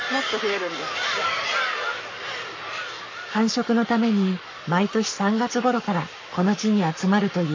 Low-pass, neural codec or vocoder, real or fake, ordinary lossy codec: 7.2 kHz; codec, 44.1 kHz, 7.8 kbps, Pupu-Codec; fake; MP3, 48 kbps